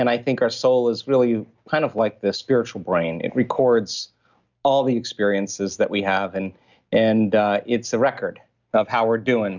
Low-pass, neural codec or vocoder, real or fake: 7.2 kHz; none; real